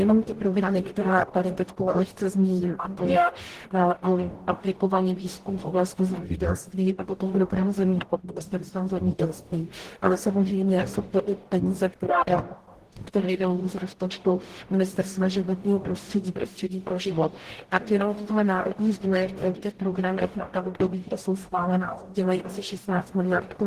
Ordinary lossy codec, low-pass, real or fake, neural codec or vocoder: Opus, 16 kbps; 14.4 kHz; fake; codec, 44.1 kHz, 0.9 kbps, DAC